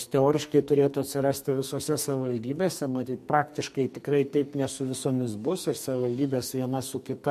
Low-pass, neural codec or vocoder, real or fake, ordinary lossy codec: 14.4 kHz; codec, 44.1 kHz, 2.6 kbps, SNAC; fake; MP3, 64 kbps